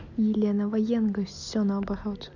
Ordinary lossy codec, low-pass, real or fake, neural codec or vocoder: none; 7.2 kHz; real; none